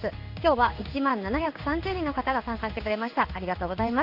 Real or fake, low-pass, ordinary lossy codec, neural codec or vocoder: fake; 5.4 kHz; Opus, 64 kbps; codec, 16 kHz in and 24 kHz out, 1 kbps, XY-Tokenizer